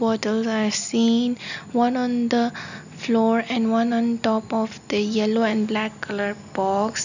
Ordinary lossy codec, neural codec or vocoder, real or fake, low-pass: none; none; real; 7.2 kHz